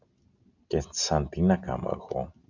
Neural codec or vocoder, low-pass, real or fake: none; 7.2 kHz; real